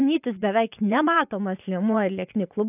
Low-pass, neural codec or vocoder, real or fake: 3.6 kHz; vocoder, 24 kHz, 100 mel bands, Vocos; fake